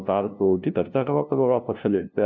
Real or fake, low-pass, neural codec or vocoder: fake; 7.2 kHz; codec, 16 kHz, 0.5 kbps, FunCodec, trained on LibriTTS, 25 frames a second